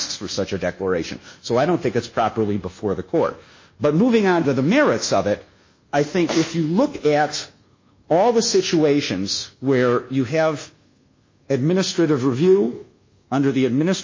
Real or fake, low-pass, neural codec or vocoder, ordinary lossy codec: fake; 7.2 kHz; codec, 24 kHz, 1.2 kbps, DualCodec; MP3, 32 kbps